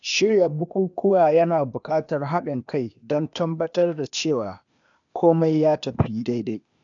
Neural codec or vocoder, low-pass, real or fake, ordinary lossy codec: codec, 16 kHz, 0.8 kbps, ZipCodec; 7.2 kHz; fake; none